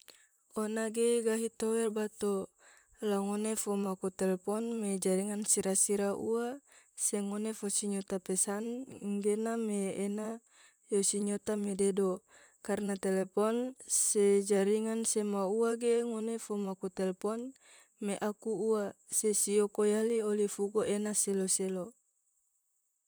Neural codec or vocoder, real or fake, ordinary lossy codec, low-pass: vocoder, 44.1 kHz, 128 mel bands, Pupu-Vocoder; fake; none; none